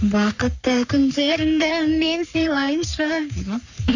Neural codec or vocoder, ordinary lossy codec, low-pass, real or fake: codec, 44.1 kHz, 3.4 kbps, Pupu-Codec; none; 7.2 kHz; fake